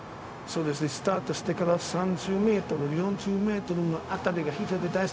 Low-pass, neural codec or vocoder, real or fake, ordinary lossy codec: none; codec, 16 kHz, 0.4 kbps, LongCat-Audio-Codec; fake; none